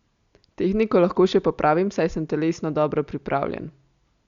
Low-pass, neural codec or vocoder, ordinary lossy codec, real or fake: 7.2 kHz; none; Opus, 64 kbps; real